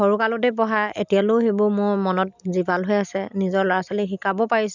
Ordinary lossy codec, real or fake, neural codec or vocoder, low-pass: none; real; none; 7.2 kHz